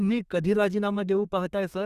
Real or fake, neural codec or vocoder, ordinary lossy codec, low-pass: fake; codec, 32 kHz, 1.9 kbps, SNAC; Opus, 64 kbps; 14.4 kHz